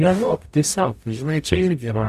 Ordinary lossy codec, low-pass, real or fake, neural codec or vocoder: Opus, 64 kbps; 14.4 kHz; fake; codec, 44.1 kHz, 0.9 kbps, DAC